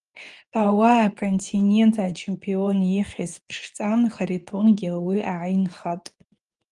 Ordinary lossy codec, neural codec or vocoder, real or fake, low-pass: Opus, 32 kbps; codec, 24 kHz, 0.9 kbps, WavTokenizer, medium speech release version 2; fake; 10.8 kHz